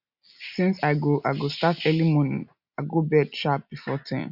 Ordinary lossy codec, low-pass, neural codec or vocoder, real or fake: none; 5.4 kHz; none; real